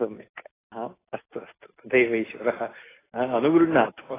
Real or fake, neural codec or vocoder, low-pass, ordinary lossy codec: real; none; 3.6 kHz; AAC, 16 kbps